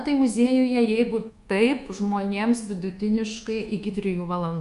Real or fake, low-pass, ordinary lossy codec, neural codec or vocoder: fake; 10.8 kHz; Opus, 64 kbps; codec, 24 kHz, 1.2 kbps, DualCodec